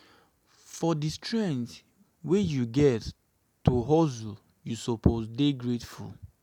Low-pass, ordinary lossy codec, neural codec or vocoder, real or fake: 19.8 kHz; none; none; real